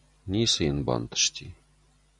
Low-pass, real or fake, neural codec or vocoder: 10.8 kHz; real; none